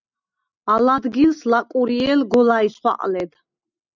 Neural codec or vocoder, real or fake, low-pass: none; real; 7.2 kHz